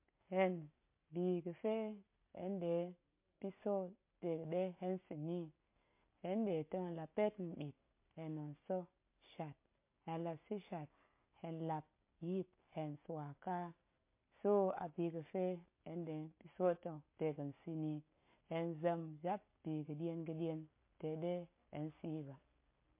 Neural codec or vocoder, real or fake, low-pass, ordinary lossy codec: none; real; 3.6 kHz; MP3, 24 kbps